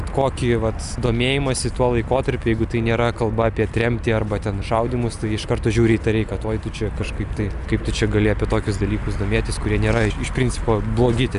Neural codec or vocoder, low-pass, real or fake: none; 10.8 kHz; real